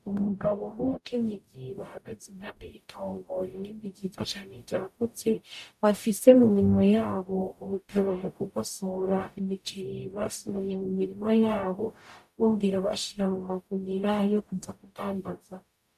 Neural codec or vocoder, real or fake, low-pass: codec, 44.1 kHz, 0.9 kbps, DAC; fake; 14.4 kHz